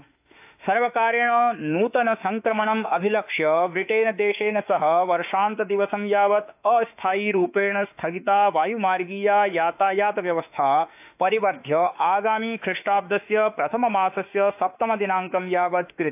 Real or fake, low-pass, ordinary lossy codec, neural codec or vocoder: fake; 3.6 kHz; AAC, 32 kbps; autoencoder, 48 kHz, 32 numbers a frame, DAC-VAE, trained on Japanese speech